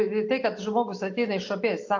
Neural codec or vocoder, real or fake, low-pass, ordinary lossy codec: none; real; 7.2 kHz; AAC, 48 kbps